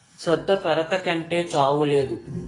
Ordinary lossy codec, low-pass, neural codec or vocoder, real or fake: AAC, 32 kbps; 10.8 kHz; codec, 44.1 kHz, 2.6 kbps, SNAC; fake